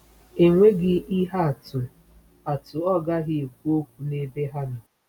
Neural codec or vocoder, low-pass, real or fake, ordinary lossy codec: none; 19.8 kHz; real; none